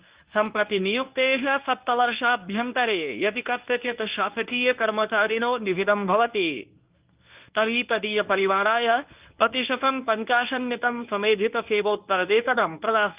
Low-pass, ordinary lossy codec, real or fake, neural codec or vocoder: 3.6 kHz; Opus, 24 kbps; fake; codec, 24 kHz, 0.9 kbps, WavTokenizer, medium speech release version 1